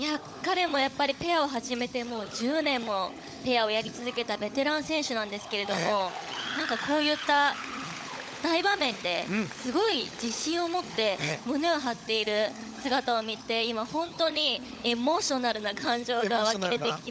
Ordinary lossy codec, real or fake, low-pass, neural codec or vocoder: none; fake; none; codec, 16 kHz, 16 kbps, FunCodec, trained on LibriTTS, 50 frames a second